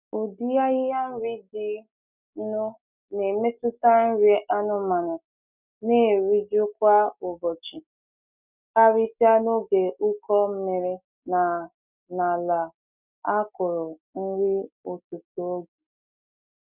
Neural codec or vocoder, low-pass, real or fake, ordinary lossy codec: none; 3.6 kHz; real; none